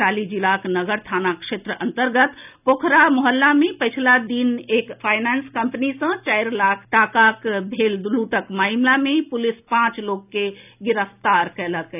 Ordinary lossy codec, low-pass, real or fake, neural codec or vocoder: none; 3.6 kHz; real; none